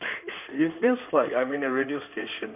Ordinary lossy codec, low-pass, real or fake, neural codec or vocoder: none; 3.6 kHz; fake; codec, 16 kHz in and 24 kHz out, 2.2 kbps, FireRedTTS-2 codec